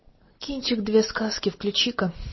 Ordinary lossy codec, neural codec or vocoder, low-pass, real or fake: MP3, 24 kbps; none; 7.2 kHz; real